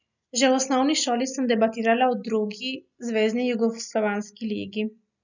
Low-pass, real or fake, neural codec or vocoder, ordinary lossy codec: 7.2 kHz; real; none; none